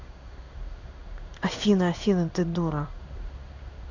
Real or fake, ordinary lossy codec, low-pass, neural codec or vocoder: fake; none; 7.2 kHz; codec, 16 kHz in and 24 kHz out, 1 kbps, XY-Tokenizer